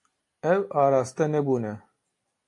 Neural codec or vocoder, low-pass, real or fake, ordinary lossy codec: none; 10.8 kHz; real; AAC, 48 kbps